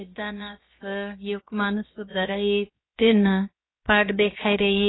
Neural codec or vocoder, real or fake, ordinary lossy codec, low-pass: codec, 16 kHz, 0.7 kbps, FocalCodec; fake; AAC, 16 kbps; 7.2 kHz